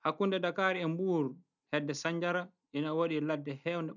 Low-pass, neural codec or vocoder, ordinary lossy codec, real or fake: 7.2 kHz; none; none; real